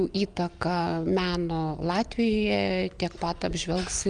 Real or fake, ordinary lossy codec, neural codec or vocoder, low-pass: real; Opus, 32 kbps; none; 9.9 kHz